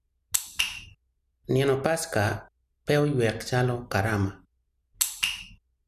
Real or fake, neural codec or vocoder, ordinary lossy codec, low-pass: real; none; none; 14.4 kHz